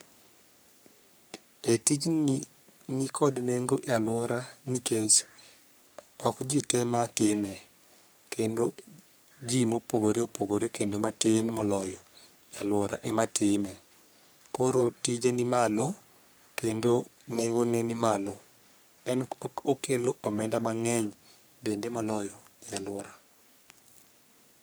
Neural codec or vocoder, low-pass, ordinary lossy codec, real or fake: codec, 44.1 kHz, 3.4 kbps, Pupu-Codec; none; none; fake